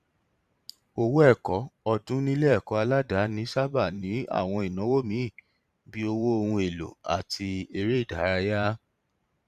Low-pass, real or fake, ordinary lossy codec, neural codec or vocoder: 14.4 kHz; fake; Opus, 64 kbps; vocoder, 44.1 kHz, 128 mel bands every 512 samples, BigVGAN v2